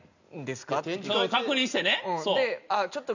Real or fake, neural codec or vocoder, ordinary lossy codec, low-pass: real; none; none; 7.2 kHz